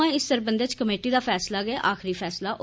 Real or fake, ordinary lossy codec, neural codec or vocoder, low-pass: real; none; none; none